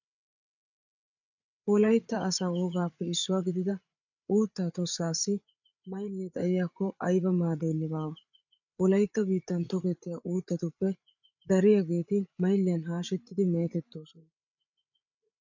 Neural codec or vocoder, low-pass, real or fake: codec, 16 kHz, 8 kbps, FreqCodec, larger model; 7.2 kHz; fake